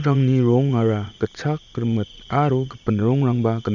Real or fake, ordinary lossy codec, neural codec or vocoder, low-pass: fake; none; vocoder, 44.1 kHz, 80 mel bands, Vocos; 7.2 kHz